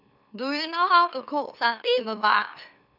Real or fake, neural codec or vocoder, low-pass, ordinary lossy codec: fake; autoencoder, 44.1 kHz, a latent of 192 numbers a frame, MeloTTS; 5.4 kHz; none